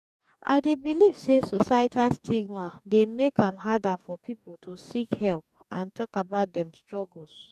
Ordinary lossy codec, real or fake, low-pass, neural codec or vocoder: none; fake; 14.4 kHz; codec, 44.1 kHz, 2.6 kbps, DAC